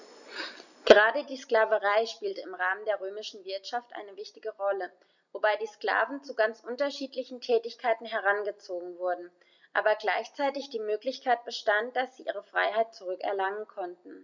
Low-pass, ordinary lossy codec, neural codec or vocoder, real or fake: 7.2 kHz; none; none; real